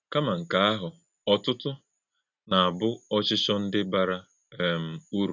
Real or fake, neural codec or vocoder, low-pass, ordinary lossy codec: real; none; 7.2 kHz; none